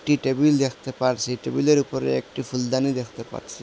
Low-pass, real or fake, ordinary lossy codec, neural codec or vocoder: none; real; none; none